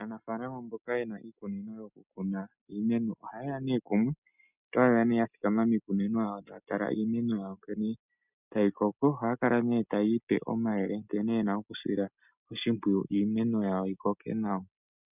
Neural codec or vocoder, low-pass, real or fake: none; 3.6 kHz; real